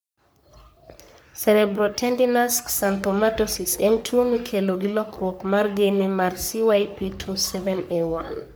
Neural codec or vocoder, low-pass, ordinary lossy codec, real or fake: codec, 44.1 kHz, 3.4 kbps, Pupu-Codec; none; none; fake